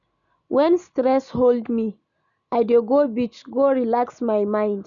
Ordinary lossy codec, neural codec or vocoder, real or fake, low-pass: none; none; real; 7.2 kHz